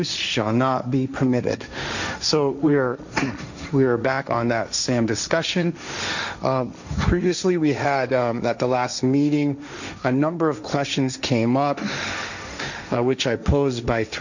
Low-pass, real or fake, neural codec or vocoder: 7.2 kHz; fake; codec, 16 kHz, 1.1 kbps, Voila-Tokenizer